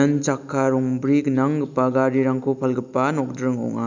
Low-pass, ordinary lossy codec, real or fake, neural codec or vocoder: 7.2 kHz; none; real; none